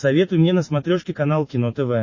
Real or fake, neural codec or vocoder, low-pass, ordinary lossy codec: real; none; 7.2 kHz; MP3, 32 kbps